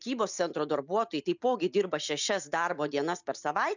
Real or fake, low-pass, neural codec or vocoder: real; 7.2 kHz; none